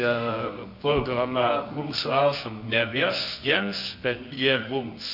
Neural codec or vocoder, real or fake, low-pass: codec, 24 kHz, 0.9 kbps, WavTokenizer, medium music audio release; fake; 5.4 kHz